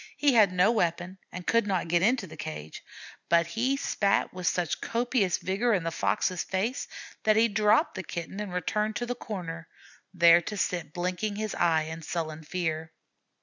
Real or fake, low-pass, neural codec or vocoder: real; 7.2 kHz; none